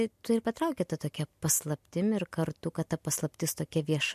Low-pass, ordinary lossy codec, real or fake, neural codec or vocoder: 14.4 kHz; MP3, 64 kbps; real; none